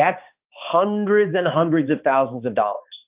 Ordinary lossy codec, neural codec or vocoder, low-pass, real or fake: Opus, 24 kbps; codec, 16 kHz, 2 kbps, X-Codec, HuBERT features, trained on balanced general audio; 3.6 kHz; fake